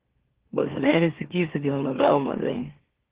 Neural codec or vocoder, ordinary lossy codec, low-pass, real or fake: autoencoder, 44.1 kHz, a latent of 192 numbers a frame, MeloTTS; Opus, 16 kbps; 3.6 kHz; fake